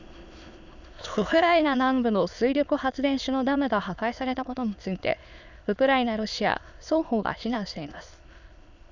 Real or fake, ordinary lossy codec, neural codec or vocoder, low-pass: fake; none; autoencoder, 22.05 kHz, a latent of 192 numbers a frame, VITS, trained on many speakers; 7.2 kHz